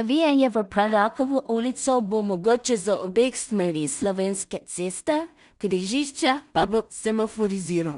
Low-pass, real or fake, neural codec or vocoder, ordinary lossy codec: 10.8 kHz; fake; codec, 16 kHz in and 24 kHz out, 0.4 kbps, LongCat-Audio-Codec, two codebook decoder; none